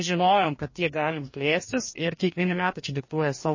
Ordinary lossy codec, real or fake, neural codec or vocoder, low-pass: MP3, 32 kbps; fake; codec, 44.1 kHz, 2.6 kbps, DAC; 7.2 kHz